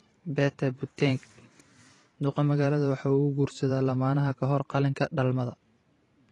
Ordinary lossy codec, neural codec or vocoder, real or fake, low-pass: AAC, 32 kbps; vocoder, 44.1 kHz, 128 mel bands every 512 samples, BigVGAN v2; fake; 10.8 kHz